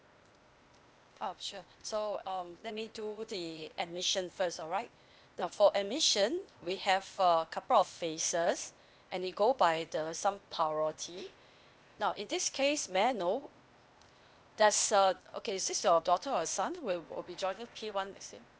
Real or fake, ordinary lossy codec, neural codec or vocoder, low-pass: fake; none; codec, 16 kHz, 0.8 kbps, ZipCodec; none